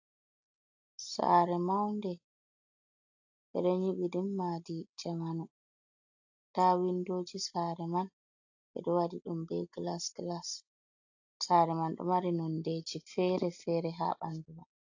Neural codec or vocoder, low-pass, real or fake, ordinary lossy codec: none; 7.2 kHz; real; AAC, 48 kbps